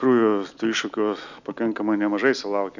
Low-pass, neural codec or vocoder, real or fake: 7.2 kHz; none; real